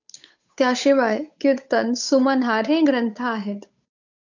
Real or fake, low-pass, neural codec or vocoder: fake; 7.2 kHz; codec, 16 kHz, 8 kbps, FunCodec, trained on Chinese and English, 25 frames a second